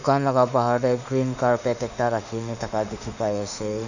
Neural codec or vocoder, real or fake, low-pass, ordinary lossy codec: autoencoder, 48 kHz, 32 numbers a frame, DAC-VAE, trained on Japanese speech; fake; 7.2 kHz; none